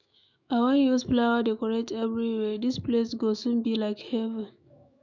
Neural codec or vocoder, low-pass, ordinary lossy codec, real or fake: autoencoder, 48 kHz, 128 numbers a frame, DAC-VAE, trained on Japanese speech; 7.2 kHz; none; fake